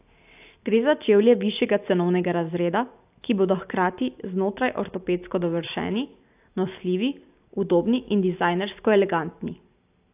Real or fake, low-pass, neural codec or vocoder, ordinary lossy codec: real; 3.6 kHz; none; AAC, 32 kbps